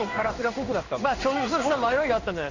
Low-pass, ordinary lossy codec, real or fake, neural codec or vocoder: 7.2 kHz; none; fake; codec, 16 kHz in and 24 kHz out, 1 kbps, XY-Tokenizer